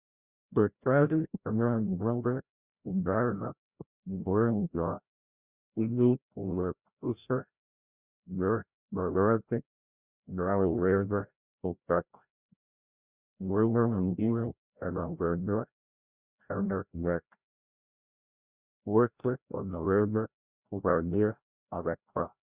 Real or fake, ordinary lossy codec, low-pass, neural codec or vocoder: fake; Opus, 64 kbps; 3.6 kHz; codec, 16 kHz, 0.5 kbps, FreqCodec, larger model